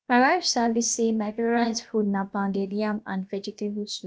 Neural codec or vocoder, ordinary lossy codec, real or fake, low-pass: codec, 16 kHz, about 1 kbps, DyCAST, with the encoder's durations; none; fake; none